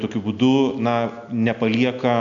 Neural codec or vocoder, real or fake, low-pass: none; real; 7.2 kHz